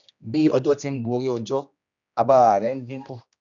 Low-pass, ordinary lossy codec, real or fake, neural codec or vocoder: 7.2 kHz; none; fake; codec, 16 kHz, 1 kbps, X-Codec, HuBERT features, trained on general audio